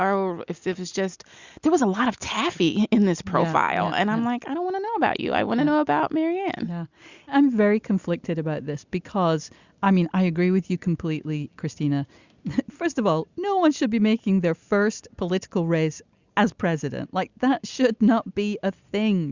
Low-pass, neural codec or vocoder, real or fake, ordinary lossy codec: 7.2 kHz; none; real; Opus, 64 kbps